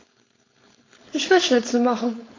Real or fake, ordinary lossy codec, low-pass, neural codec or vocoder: fake; AAC, 32 kbps; 7.2 kHz; codec, 16 kHz, 4.8 kbps, FACodec